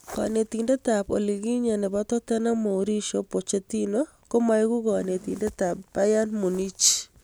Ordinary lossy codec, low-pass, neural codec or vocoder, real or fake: none; none; none; real